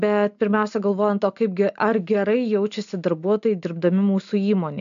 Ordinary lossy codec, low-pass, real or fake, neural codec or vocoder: AAC, 96 kbps; 7.2 kHz; real; none